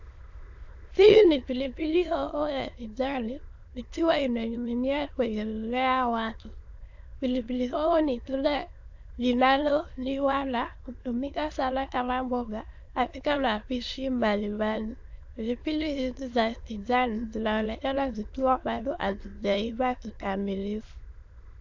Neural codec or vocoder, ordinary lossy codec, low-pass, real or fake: autoencoder, 22.05 kHz, a latent of 192 numbers a frame, VITS, trained on many speakers; AAC, 48 kbps; 7.2 kHz; fake